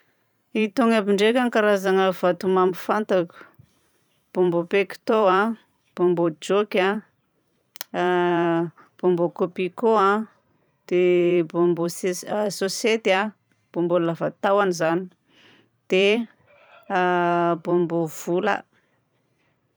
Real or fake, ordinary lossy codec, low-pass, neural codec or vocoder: fake; none; none; vocoder, 44.1 kHz, 128 mel bands every 512 samples, BigVGAN v2